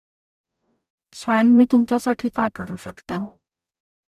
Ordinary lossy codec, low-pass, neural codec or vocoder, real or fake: none; 14.4 kHz; codec, 44.1 kHz, 0.9 kbps, DAC; fake